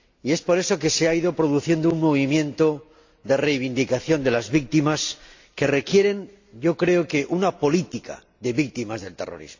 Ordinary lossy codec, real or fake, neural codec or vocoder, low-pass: AAC, 48 kbps; real; none; 7.2 kHz